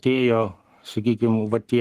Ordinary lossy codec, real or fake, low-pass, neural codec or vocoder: Opus, 24 kbps; fake; 14.4 kHz; codec, 44.1 kHz, 7.8 kbps, Pupu-Codec